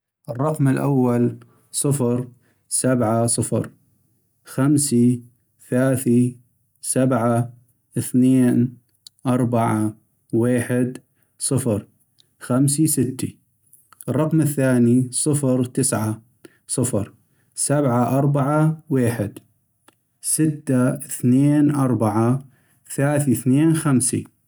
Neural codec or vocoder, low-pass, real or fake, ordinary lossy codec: none; none; real; none